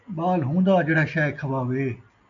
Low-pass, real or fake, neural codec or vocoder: 7.2 kHz; real; none